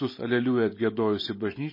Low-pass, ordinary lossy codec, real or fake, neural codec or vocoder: 5.4 kHz; MP3, 24 kbps; real; none